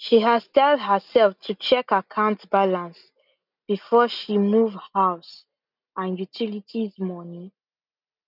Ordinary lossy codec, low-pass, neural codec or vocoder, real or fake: none; 5.4 kHz; none; real